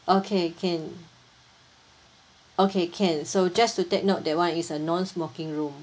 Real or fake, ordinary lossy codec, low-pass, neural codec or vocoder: real; none; none; none